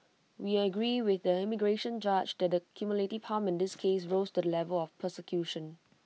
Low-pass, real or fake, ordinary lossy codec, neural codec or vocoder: none; real; none; none